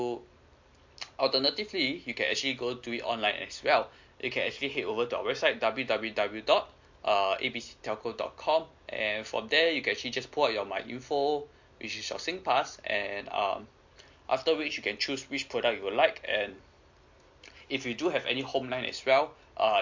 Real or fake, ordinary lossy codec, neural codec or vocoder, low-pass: real; MP3, 48 kbps; none; 7.2 kHz